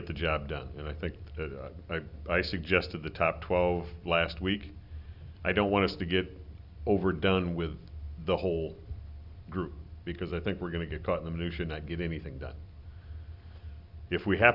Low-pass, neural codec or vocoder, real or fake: 5.4 kHz; none; real